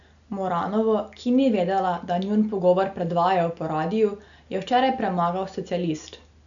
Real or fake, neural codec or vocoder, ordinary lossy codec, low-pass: real; none; MP3, 96 kbps; 7.2 kHz